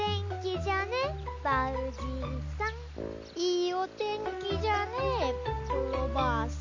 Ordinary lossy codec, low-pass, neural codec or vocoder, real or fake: MP3, 48 kbps; 7.2 kHz; none; real